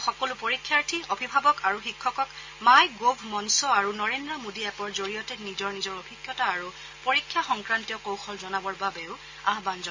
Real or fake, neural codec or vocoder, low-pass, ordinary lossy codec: real; none; 7.2 kHz; MP3, 48 kbps